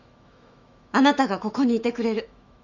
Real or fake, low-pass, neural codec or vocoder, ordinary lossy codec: fake; 7.2 kHz; autoencoder, 48 kHz, 128 numbers a frame, DAC-VAE, trained on Japanese speech; none